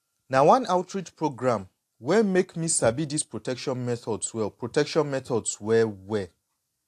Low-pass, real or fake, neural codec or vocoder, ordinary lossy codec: 14.4 kHz; real; none; AAC, 64 kbps